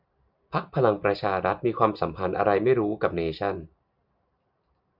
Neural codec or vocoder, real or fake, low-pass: none; real; 5.4 kHz